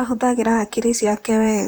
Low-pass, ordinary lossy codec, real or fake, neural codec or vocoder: none; none; fake; vocoder, 44.1 kHz, 128 mel bands, Pupu-Vocoder